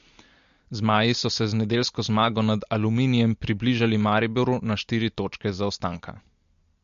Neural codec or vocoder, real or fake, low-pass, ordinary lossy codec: none; real; 7.2 kHz; MP3, 48 kbps